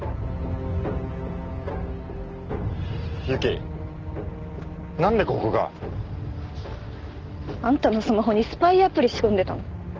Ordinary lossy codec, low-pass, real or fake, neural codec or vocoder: Opus, 16 kbps; 7.2 kHz; real; none